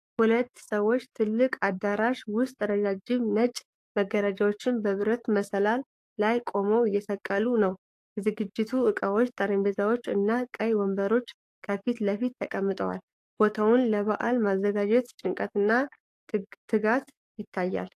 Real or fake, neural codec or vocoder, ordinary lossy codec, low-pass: fake; codec, 44.1 kHz, 7.8 kbps, DAC; AAC, 64 kbps; 14.4 kHz